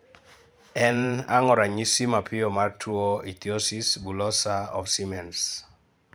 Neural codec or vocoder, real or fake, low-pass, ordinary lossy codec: vocoder, 44.1 kHz, 128 mel bands every 512 samples, BigVGAN v2; fake; none; none